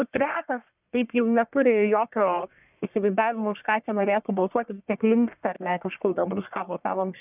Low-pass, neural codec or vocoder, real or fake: 3.6 kHz; codec, 44.1 kHz, 1.7 kbps, Pupu-Codec; fake